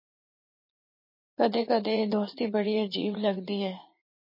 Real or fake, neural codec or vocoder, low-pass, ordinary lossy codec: fake; vocoder, 44.1 kHz, 80 mel bands, Vocos; 5.4 kHz; MP3, 24 kbps